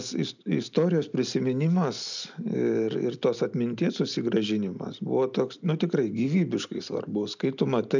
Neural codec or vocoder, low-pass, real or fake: none; 7.2 kHz; real